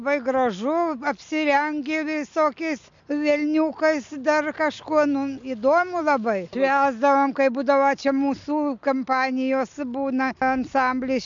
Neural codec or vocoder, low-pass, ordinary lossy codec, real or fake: none; 7.2 kHz; MP3, 64 kbps; real